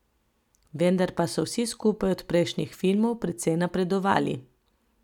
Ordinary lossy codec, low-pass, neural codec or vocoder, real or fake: none; 19.8 kHz; none; real